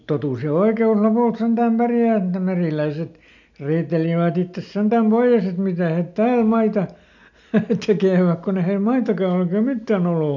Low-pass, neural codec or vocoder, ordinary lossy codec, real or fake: 7.2 kHz; none; MP3, 64 kbps; real